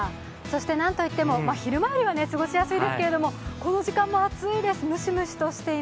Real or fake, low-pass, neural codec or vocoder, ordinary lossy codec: real; none; none; none